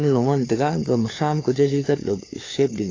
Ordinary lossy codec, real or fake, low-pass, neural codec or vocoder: AAC, 32 kbps; fake; 7.2 kHz; codec, 16 kHz, 4 kbps, FunCodec, trained on LibriTTS, 50 frames a second